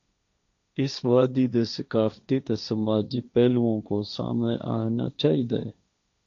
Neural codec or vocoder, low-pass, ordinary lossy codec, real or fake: codec, 16 kHz, 1.1 kbps, Voila-Tokenizer; 7.2 kHz; AAC, 64 kbps; fake